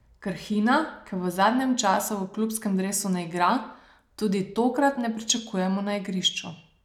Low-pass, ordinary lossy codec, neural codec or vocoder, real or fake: 19.8 kHz; none; none; real